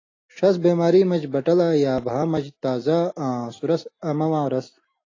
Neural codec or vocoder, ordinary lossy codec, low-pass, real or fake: none; AAC, 32 kbps; 7.2 kHz; real